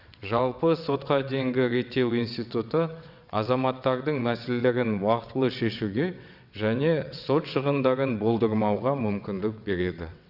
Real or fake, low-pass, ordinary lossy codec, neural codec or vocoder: fake; 5.4 kHz; none; vocoder, 22.05 kHz, 80 mel bands, WaveNeXt